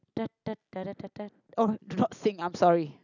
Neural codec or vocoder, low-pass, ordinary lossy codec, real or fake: none; 7.2 kHz; none; real